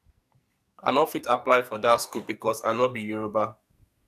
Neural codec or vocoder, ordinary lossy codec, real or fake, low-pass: codec, 44.1 kHz, 2.6 kbps, SNAC; none; fake; 14.4 kHz